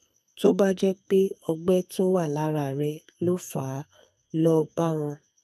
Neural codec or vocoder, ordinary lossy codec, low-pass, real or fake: codec, 44.1 kHz, 2.6 kbps, SNAC; none; 14.4 kHz; fake